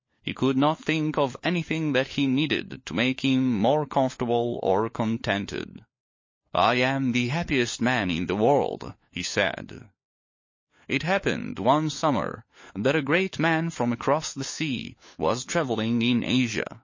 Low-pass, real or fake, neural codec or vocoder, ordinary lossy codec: 7.2 kHz; fake; codec, 16 kHz, 4 kbps, FunCodec, trained on LibriTTS, 50 frames a second; MP3, 32 kbps